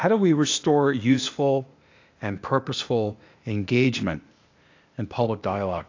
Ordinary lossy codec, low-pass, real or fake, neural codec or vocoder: AAC, 48 kbps; 7.2 kHz; fake; codec, 16 kHz, 0.8 kbps, ZipCodec